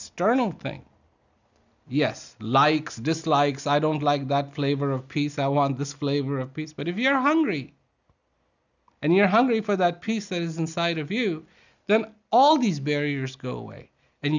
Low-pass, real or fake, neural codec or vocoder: 7.2 kHz; real; none